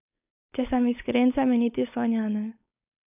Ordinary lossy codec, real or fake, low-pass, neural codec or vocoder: none; fake; 3.6 kHz; codec, 16 kHz, 4.8 kbps, FACodec